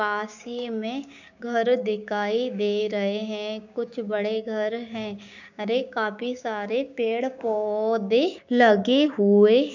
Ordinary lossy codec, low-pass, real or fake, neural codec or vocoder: none; 7.2 kHz; fake; codec, 16 kHz, 6 kbps, DAC